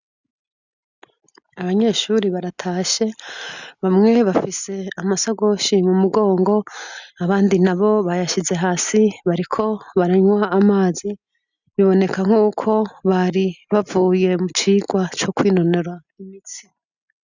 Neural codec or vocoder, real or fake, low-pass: none; real; 7.2 kHz